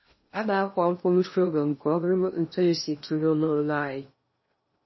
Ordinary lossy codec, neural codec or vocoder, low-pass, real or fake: MP3, 24 kbps; codec, 16 kHz in and 24 kHz out, 0.6 kbps, FocalCodec, streaming, 2048 codes; 7.2 kHz; fake